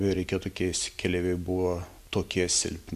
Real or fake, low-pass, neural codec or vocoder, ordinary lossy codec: real; 14.4 kHz; none; MP3, 96 kbps